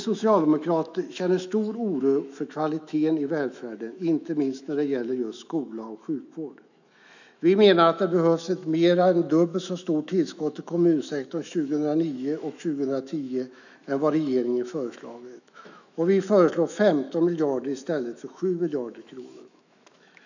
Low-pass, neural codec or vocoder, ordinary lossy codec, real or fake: 7.2 kHz; autoencoder, 48 kHz, 128 numbers a frame, DAC-VAE, trained on Japanese speech; none; fake